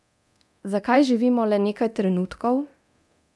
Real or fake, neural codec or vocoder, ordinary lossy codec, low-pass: fake; codec, 24 kHz, 0.9 kbps, DualCodec; none; none